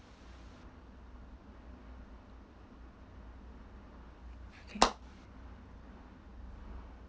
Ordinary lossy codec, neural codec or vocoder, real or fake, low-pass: none; none; real; none